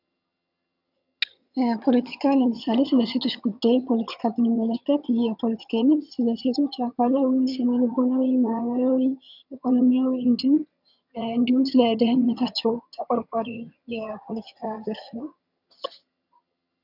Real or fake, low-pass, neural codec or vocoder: fake; 5.4 kHz; vocoder, 22.05 kHz, 80 mel bands, HiFi-GAN